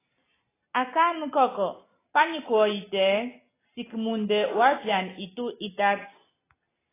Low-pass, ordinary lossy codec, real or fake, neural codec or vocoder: 3.6 kHz; AAC, 16 kbps; real; none